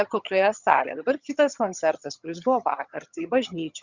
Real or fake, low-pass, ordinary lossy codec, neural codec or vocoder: fake; 7.2 kHz; Opus, 64 kbps; vocoder, 22.05 kHz, 80 mel bands, HiFi-GAN